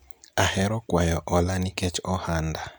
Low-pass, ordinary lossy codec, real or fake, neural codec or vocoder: none; none; real; none